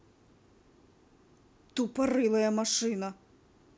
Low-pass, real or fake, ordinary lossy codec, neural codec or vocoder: none; real; none; none